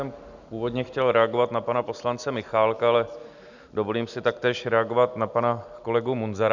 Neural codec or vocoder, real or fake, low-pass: none; real; 7.2 kHz